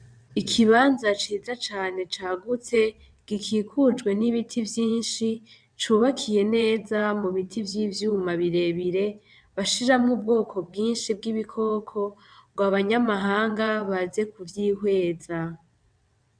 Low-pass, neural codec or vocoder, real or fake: 9.9 kHz; vocoder, 22.05 kHz, 80 mel bands, WaveNeXt; fake